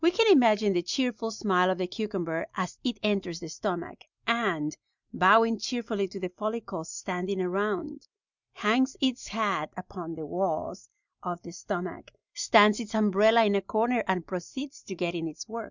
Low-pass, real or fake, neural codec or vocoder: 7.2 kHz; real; none